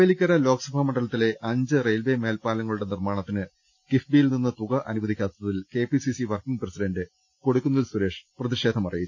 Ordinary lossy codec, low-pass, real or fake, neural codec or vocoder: none; none; real; none